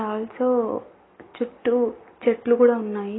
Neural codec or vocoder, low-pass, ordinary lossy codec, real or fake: none; 7.2 kHz; AAC, 16 kbps; real